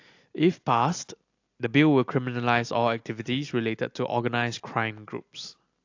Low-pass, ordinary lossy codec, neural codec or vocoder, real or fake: 7.2 kHz; AAC, 48 kbps; none; real